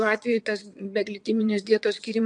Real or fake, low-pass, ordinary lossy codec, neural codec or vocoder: fake; 9.9 kHz; MP3, 64 kbps; vocoder, 22.05 kHz, 80 mel bands, WaveNeXt